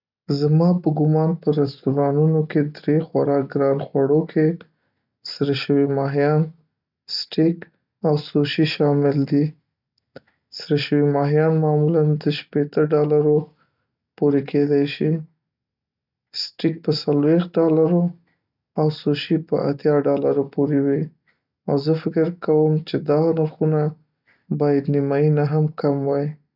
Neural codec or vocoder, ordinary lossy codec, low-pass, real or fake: none; none; 5.4 kHz; real